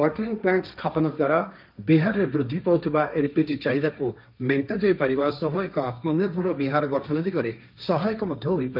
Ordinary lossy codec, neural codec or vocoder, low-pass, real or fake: none; codec, 16 kHz, 1.1 kbps, Voila-Tokenizer; 5.4 kHz; fake